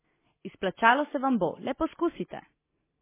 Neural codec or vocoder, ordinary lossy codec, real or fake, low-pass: none; MP3, 16 kbps; real; 3.6 kHz